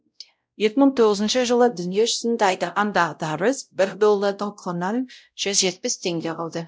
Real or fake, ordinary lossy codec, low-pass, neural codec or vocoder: fake; none; none; codec, 16 kHz, 0.5 kbps, X-Codec, WavLM features, trained on Multilingual LibriSpeech